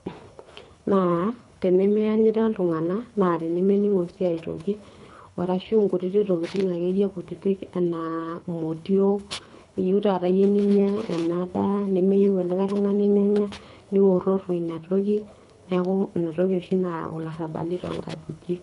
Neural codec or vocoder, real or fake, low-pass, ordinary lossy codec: codec, 24 kHz, 3 kbps, HILCodec; fake; 10.8 kHz; none